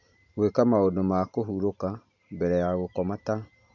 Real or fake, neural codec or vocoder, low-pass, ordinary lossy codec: real; none; 7.2 kHz; none